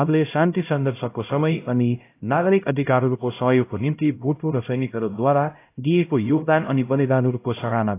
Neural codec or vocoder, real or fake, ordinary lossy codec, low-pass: codec, 16 kHz, 0.5 kbps, X-Codec, HuBERT features, trained on LibriSpeech; fake; AAC, 24 kbps; 3.6 kHz